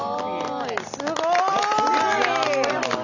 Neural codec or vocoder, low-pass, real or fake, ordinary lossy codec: none; 7.2 kHz; real; none